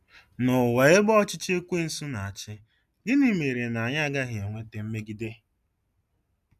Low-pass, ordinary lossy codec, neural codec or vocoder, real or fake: 14.4 kHz; none; none; real